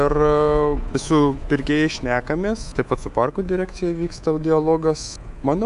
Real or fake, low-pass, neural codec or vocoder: fake; 10.8 kHz; codec, 24 kHz, 3.1 kbps, DualCodec